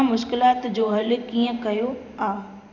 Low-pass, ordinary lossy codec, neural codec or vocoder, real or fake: 7.2 kHz; none; vocoder, 44.1 kHz, 128 mel bands every 512 samples, BigVGAN v2; fake